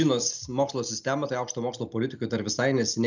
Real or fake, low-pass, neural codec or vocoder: real; 7.2 kHz; none